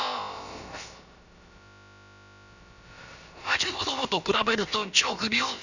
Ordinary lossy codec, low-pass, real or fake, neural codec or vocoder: none; 7.2 kHz; fake; codec, 16 kHz, about 1 kbps, DyCAST, with the encoder's durations